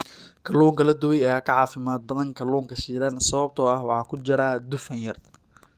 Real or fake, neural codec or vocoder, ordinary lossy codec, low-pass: fake; codec, 44.1 kHz, 7.8 kbps, DAC; Opus, 32 kbps; 14.4 kHz